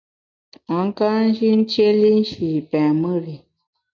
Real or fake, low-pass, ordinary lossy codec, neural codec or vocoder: real; 7.2 kHz; MP3, 64 kbps; none